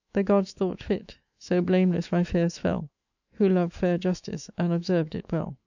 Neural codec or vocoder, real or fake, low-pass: autoencoder, 48 kHz, 128 numbers a frame, DAC-VAE, trained on Japanese speech; fake; 7.2 kHz